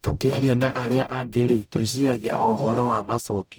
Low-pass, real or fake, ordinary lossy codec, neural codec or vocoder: none; fake; none; codec, 44.1 kHz, 0.9 kbps, DAC